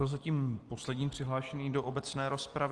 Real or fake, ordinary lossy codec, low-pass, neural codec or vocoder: real; Opus, 24 kbps; 10.8 kHz; none